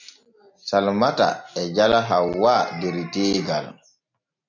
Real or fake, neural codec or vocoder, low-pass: real; none; 7.2 kHz